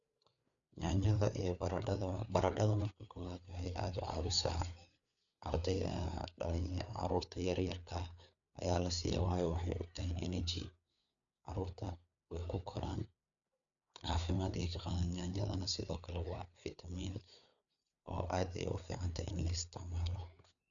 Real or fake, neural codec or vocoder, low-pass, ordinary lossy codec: fake; codec, 16 kHz, 4 kbps, FreqCodec, larger model; 7.2 kHz; none